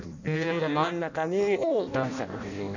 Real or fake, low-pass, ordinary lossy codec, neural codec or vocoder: fake; 7.2 kHz; none; codec, 16 kHz in and 24 kHz out, 0.6 kbps, FireRedTTS-2 codec